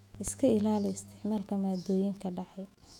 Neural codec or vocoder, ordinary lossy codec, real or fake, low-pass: autoencoder, 48 kHz, 128 numbers a frame, DAC-VAE, trained on Japanese speech; none; fake; 19.8 kHz